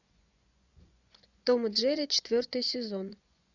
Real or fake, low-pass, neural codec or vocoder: fake; 7.2 kHz; vocoder, 24 kHz, 100 mel bands, Vocos